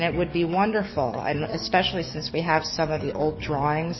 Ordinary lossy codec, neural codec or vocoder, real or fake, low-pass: MP3, 24 kbps; codec, 44.1 kHz, 7.8 kbps, DAC; fake; 7.2 kHz